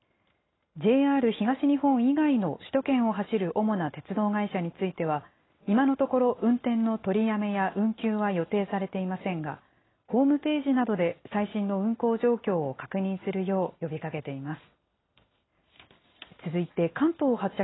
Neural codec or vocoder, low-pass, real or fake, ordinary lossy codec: none; 7.2 kHz; real; AAC, 16 kbps